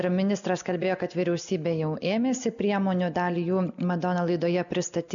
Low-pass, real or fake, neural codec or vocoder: 7.2 kHz; real; none